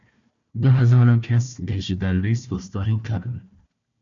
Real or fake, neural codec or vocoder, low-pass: fake; codec, 16 kHz, 1 kbps, FunCodec, trained on Chinese and English, 50 frames a second; 7.2 kHz